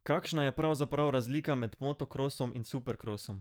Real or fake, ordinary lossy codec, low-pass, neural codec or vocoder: fake; none; none; codec, 44.1 kHz, 7.8 kbps, DAC